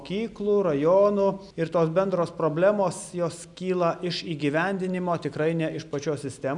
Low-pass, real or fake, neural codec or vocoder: 10.8 kHz; real; none